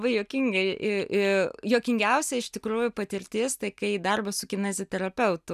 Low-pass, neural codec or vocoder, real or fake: 14.4 kHz; none; real